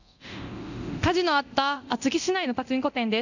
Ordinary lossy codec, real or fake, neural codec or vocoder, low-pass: none; fake; codec, 24 kHz, 0.9 kbps, DualCodec; 7.2 kHz